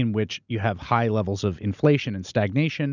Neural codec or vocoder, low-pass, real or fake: none; 7.2 kHz; real